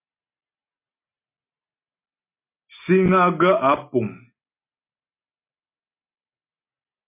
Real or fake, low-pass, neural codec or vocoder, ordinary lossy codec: real; 3.6 kHz; none; MP3, 24 kbps